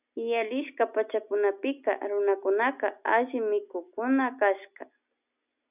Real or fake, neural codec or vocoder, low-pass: real; none; 3.6 kHz